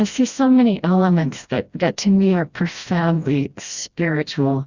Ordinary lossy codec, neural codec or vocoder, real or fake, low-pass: Opus, 64 kbps; codec, 16 kHz, 1 kbps, FreqCodec, smaller model; fake; 7.2 kHz